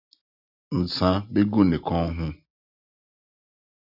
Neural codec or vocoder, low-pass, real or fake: none; 5.4 kHz; real